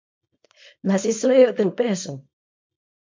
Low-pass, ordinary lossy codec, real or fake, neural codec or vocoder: 7.2 kHz; MP3, 48 kbps; fake; codec, 24 kHz, 0.9 kbps, WavTokenizer, small release